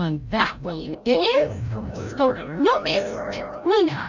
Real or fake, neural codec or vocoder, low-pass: fake; codec, 16 kHz, 0.5 kbps, FreqCodec, larger model; 7.2 kHz